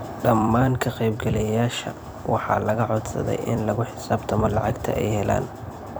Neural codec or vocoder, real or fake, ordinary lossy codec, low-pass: vocoder, 44.1 kHz, 128 mel bands every 256 samples, BigVGAN v2; fake; none; none